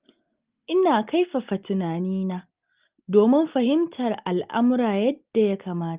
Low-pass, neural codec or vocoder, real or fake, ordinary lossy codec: 3.6 kHz; none; real; Opus, 32 kbps